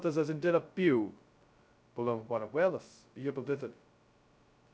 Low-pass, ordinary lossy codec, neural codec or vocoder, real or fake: none; none; codec, 16 kHz, 0.2 kbps, FocalCodec; fake